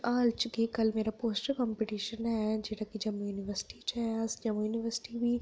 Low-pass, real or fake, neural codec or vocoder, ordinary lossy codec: none; real; none; none